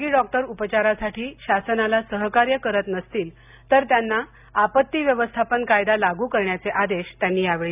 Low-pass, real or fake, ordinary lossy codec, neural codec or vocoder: 3.6 kHz; real; none; none